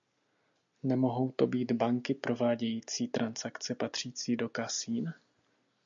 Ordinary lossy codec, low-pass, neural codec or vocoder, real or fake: MP3, 64 kbps; 7.2 kHz; none; real